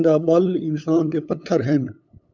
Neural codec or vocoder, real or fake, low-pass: codec, 16 kHz, 8 kbps, FunCodec, trained on LibriTTS, 25 frames a second; fake; 7.2 kHz